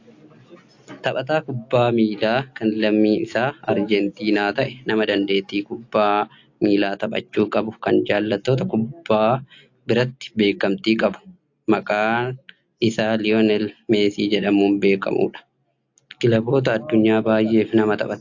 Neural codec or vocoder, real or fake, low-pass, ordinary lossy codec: none; real; 7.2 kHz; AAC, 48 kbps